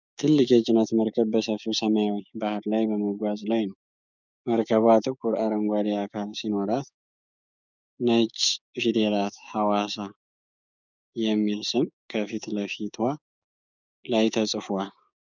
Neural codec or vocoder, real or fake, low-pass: codec, 16 kHz, 6 kbps, DAC; fake; 7.2 kHz